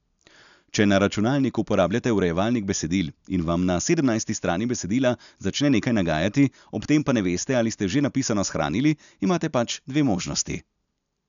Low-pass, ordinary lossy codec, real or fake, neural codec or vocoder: 7.2 kHz; none; real; none